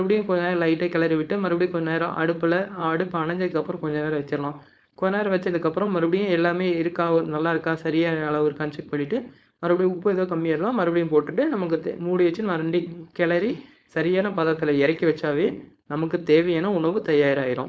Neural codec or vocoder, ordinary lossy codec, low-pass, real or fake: codec, 16 kHz, 4.8 kbps, FACodec; none; none; fake